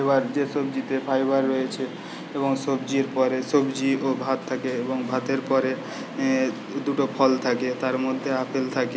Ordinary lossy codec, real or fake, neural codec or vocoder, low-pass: none; real; none; none